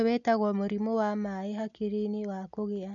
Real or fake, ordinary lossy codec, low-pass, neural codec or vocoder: real; none; 7.2 kHz; none